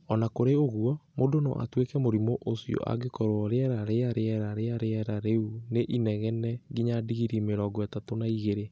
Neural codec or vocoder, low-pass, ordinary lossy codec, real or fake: none; none; none; real